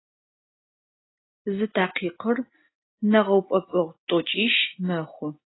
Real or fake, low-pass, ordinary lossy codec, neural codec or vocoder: real; 7.2 kHz; AAC, 16 kbps; none